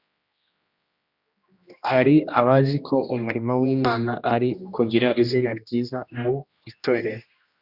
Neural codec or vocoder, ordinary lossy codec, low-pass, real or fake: codec, 16 kHz, 1 kbps, X-Codec, HuBERT features, trained on general audio; Opus, 64 kbps; 5.4 kHz; fake